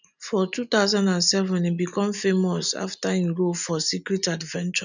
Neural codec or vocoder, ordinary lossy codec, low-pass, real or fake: none; none; 7.2 kHz; real